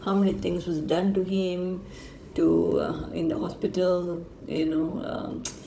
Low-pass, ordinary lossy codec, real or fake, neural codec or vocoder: none; none; fake; codec, 16 kHz, 16 kbps, FunCodec, trained on Chinese and English, 50 frames a second